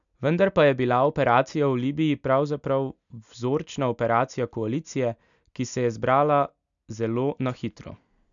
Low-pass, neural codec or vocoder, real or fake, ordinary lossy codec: 7.2 kHz; none; real; none